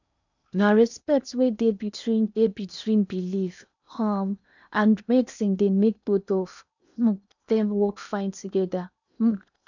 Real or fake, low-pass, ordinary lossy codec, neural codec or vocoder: fake; 7.2 kHz; none; codec, 16 kHz in and 24 kHz out, 0.8 kbps, FocalCodec, streaming, 65536 codes